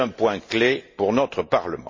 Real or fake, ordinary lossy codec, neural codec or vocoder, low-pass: real; MP3, 32 kbps; none; 7.2 kHz